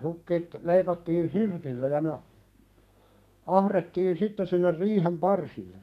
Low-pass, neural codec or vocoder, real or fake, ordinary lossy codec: 14.4 kHz; codec, 32 kHz, 1.9 kbps, SNAC; fake; none